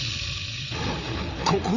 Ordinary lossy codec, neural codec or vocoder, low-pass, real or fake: none; codec, 16 kHz, 8 kbps, FreqCodec, larger model; 7.2 kHz; fake